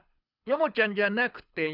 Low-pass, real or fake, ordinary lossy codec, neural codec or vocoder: 5.4 kHz; fake; none; codec, 24 kHz, 6 kbps, HILCodec